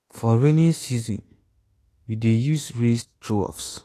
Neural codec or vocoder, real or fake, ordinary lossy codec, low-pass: autoencoder, 48 kHz, 32 numbers a frame, DAC-VAE, trained on Japanese speech; fake; AAC, 48 kbps; 14.4 kHz